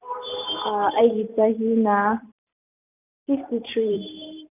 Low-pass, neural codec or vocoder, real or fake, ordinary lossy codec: 3.6 kHz; vocoder, 44.1 kHz, 128 mel bands every 512 samples, BigVGAN v2; fake; none